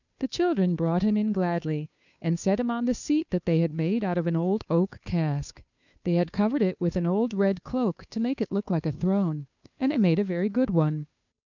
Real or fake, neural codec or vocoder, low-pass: fake; codec, 16 kHz, 2 kbps, FunCodec, trained on Chinese and English, 25 frames a second; 7.2 kHz